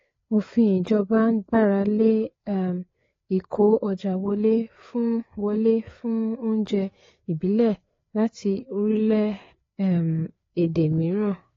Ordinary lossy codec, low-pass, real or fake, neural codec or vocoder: AAC, 32 kbps; 7.2 kHz; fake; codec, 16 kHz, 4 kbps, FreqCodec, larger model